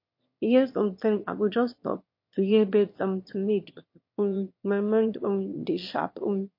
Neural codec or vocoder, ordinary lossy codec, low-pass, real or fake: autoencoder, 22.05 kHz, a latent of 192 numbers a frame, VITS, trained on one speaker; MP3, 32 kbps; 5.4 kHz; fake